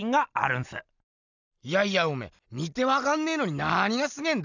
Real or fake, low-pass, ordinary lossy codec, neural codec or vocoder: real; 7.2 kHz; none; none